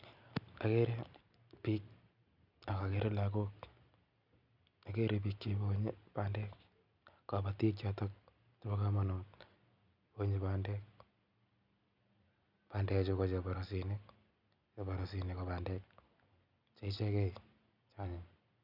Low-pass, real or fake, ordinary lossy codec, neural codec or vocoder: 5.4 kHz; real; none; none